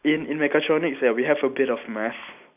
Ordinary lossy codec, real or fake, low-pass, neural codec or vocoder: none; real; 3.6 kHz; none